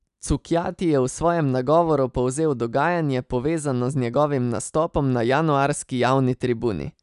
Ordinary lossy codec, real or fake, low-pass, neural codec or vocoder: none; real; 10.8 kHz; none